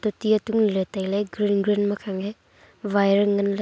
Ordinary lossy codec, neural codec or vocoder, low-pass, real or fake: none; none; none; real